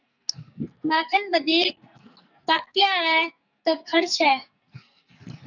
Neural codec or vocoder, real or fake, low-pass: codec, 44.1 kHz, 2.6 kbps, SNAC; fake; 7.2 kHz